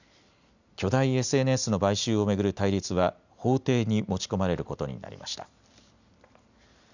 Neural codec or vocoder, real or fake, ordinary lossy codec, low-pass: none; real; none; 7.2 kHz